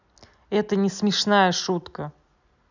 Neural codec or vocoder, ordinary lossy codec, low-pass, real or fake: none; none; 7.2 kHz; real